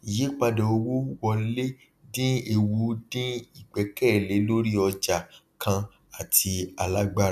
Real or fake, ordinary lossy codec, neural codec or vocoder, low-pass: real; none; none; 14.4 kHz